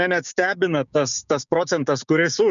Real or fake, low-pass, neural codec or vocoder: fake; 7.2 kHz; codec, 16 kHz, 6 kbps, DAC